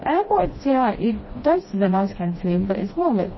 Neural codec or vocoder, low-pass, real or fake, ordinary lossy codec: codec, 16 kHz, 1 kbps, FreqCodec, smaller model; 7.2 kHz; fake; MP3, 24 kbps